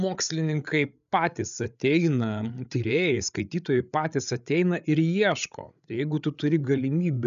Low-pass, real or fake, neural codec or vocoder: 7.2 kHz; fake; codec, 16 kHz, 8 kbps, FreqCodec, larger model